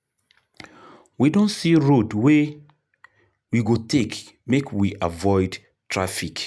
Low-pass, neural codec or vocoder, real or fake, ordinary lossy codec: none; none; real; none